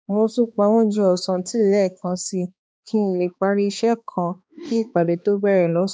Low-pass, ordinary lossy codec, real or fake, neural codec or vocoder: none; none; fake; codec, 16 kHz, 2 kbps, X-Codec, HuBERT features, trained on balanced general audio